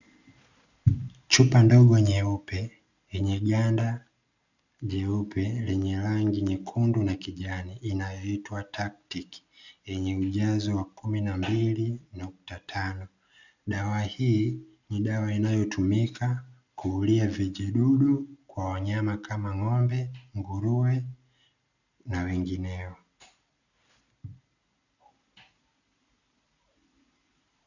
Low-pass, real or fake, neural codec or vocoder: 7.2 kHz; real; none